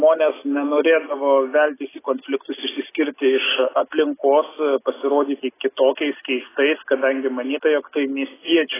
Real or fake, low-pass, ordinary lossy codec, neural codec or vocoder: real; 3.6 kHz; AAC, 16 kbps; none